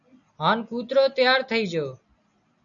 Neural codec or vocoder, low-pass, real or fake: none; 7.2 kHz; real